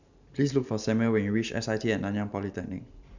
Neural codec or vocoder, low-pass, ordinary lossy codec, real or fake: vocoder, 44.1 kHz, 128 mel bands every 512 samples, BigVGAN v2; 7.2 kHz; none; fake